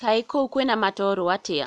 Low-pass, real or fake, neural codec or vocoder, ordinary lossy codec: none; real; none; none